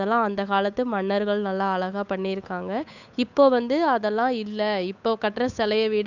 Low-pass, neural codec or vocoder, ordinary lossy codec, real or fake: 7.2 kHz; codec, 16 kHz, 8 kbps, FunCodec, trained on Chinese and English, 25 frames a second; none; fake